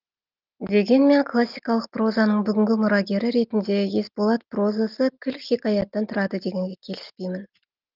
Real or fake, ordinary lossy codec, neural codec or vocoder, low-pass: real; Opus, 32 kbps; none; 5.4 kHz